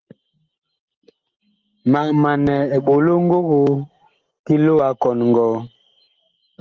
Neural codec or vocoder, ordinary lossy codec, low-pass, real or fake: none; Opus, 16 kbps; 7.2 kHz; real